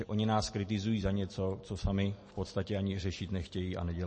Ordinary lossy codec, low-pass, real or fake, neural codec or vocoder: MP3, 32 kbps; 10.8 kHz; real; none